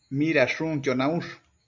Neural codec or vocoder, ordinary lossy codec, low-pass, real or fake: none; MP3, 64 kbps; 7.2 kHz; real